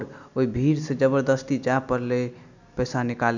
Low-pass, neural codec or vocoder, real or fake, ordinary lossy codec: 7.2 kHz; none; real; none